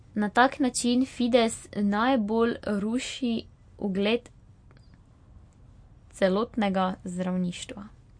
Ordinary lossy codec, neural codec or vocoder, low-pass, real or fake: MP3, 48 kbps; none; 9.9 kHz; real